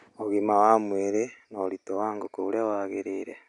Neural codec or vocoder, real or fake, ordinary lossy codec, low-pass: none; real; none; 10.8 kHz